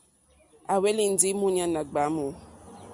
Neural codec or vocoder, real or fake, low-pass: none; real; 10.8 kHz